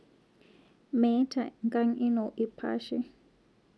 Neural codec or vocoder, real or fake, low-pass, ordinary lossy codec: none; real; none; none